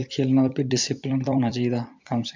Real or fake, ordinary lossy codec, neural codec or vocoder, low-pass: fake; MP3, 64 kbps; vocoder, 44.1 kHz, 128 mel bands every 256 samples, BigVGAN v2; 7.2 kHz